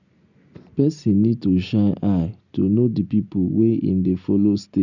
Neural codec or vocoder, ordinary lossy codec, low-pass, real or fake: none; none; 7.2 kHz; real